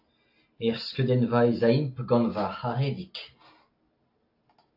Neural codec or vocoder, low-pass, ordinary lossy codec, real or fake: none; 5.4 kHz; AAC, 32 kbps; real